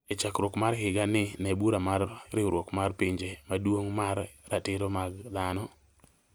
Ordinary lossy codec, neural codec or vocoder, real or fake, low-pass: none; none; real; none